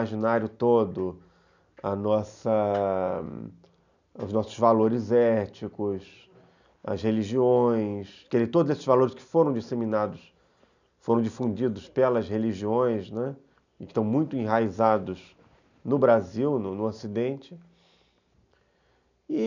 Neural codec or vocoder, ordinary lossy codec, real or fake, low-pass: none; none; real; 7.2 kHz